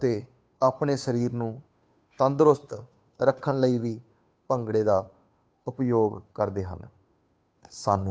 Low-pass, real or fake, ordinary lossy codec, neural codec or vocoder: none; fake; none; codec, 16 kHz, 2 kbps, FunCodec, trained on Chinese and English, 25 frames a second